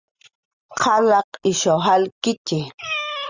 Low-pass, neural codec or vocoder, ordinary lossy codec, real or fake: 7.2 kHz; none; Opus, 64 kbps; real